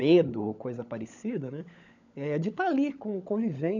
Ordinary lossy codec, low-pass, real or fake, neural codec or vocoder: none; 7.2 kHz; fake; codec, 16 kHz, 16 kbps, FunCodec, trained on LibriTTS, 50 frames a second